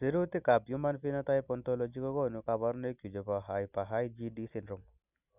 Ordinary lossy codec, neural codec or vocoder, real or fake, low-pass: none; none; real; 3.6 kHz